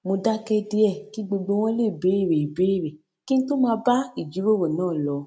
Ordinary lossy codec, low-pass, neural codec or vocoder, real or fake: none; none; none; real